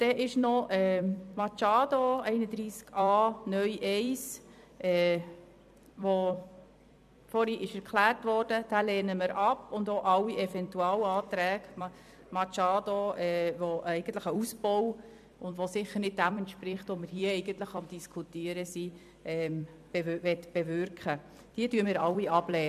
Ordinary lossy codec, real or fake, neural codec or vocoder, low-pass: MP3, 96 kbps; fake; vocoder, 44.1 kHz, 128 mel bands every 256 samples, BigVGAN v2; 14.4 kHz